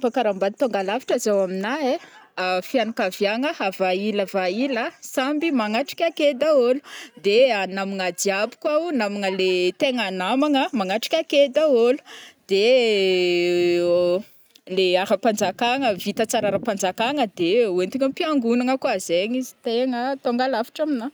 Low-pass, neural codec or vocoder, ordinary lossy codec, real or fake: none; none; none; real